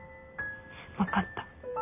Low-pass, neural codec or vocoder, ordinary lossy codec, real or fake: 3.6 kHz; none; none; real